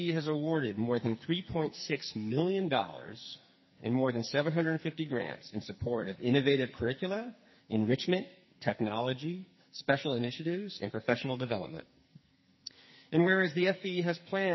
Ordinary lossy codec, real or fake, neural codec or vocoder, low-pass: MP3, 24 kbps; fake; codec, 44.1 kHz, 2.6 kbps, SNAC; 7.2 kHz